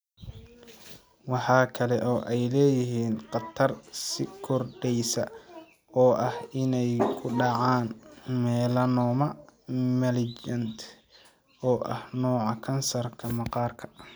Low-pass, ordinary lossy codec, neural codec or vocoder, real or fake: none; none; none; real